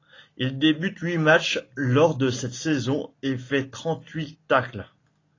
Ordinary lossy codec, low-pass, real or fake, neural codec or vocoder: AAC, 32 kbps; 7.2 kHz; real; none